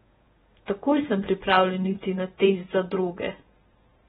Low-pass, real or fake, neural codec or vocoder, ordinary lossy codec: 19.8 kHz; fake; vocoder, 48 kHz, 128 mel bands, Vocos; AAC, 16 kbps